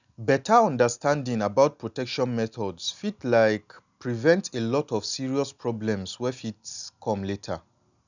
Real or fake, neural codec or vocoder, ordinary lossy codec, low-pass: real; none; none; 7.2 kHz